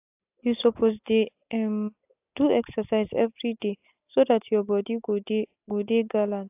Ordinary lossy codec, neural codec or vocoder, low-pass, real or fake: AAC, 32 kbps; none; 3.6 kHz; real